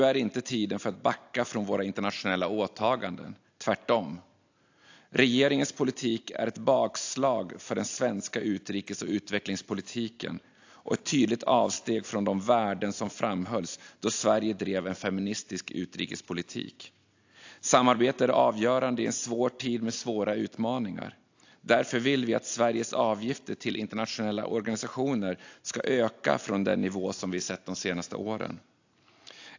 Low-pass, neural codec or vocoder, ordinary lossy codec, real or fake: 7.2 kHz; none; AAC, 48 kbps; real